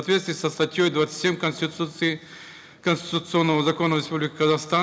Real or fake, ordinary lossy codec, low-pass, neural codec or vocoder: real; none; none; none